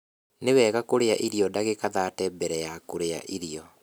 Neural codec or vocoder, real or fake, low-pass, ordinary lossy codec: none; real; none; none